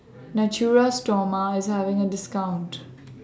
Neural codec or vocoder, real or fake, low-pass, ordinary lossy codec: none; real; none; none